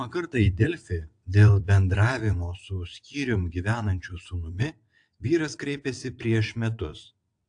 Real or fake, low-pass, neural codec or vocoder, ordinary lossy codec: fake; 9.9 kHz; vocoder, 22.05 kHz, 80 mel bands, WaveNeXt; AAC, 64 kbps